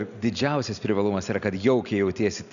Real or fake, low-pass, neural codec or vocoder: real; 7.2 kHz; none